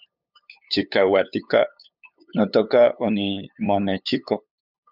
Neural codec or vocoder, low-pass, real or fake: codec, 16 kHz, 8 kbps, FunCodec, trained on LibriTTS, 25 frames a second; 5.4 kHz; fake